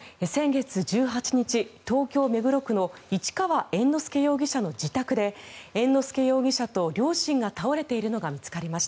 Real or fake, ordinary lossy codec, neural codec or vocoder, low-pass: real; none; none; none